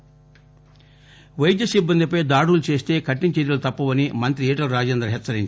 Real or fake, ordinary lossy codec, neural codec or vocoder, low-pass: real; none; none; none